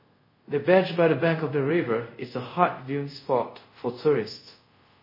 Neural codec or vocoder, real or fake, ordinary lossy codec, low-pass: codec, 24 kHz, 0.5 kbps, DualCodec; fake; MP3, 24 kbps; 5.4 kHz